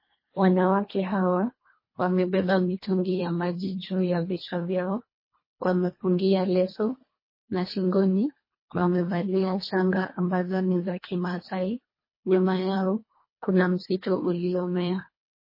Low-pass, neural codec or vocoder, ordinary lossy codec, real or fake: 5.4 kHz; codec, 24 kHz, 1.5 kbps, HILCodec; MP3, 24 kbps; fake